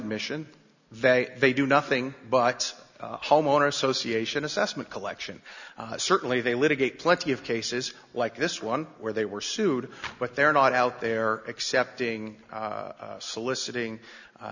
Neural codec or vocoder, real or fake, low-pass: none; real; 7.2 kHz